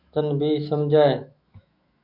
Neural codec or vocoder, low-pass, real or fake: autoencoder, 48 kHz, 128 numbers a frame, DAC-VAE, trained on Japanese speech; 5.4 kHz; fake